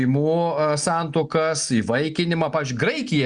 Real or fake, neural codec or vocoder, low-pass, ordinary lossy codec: real; none; 9.9 kHz; Opus, 64 kbps